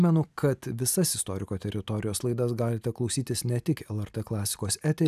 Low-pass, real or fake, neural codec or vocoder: 14.4 kHz; real; none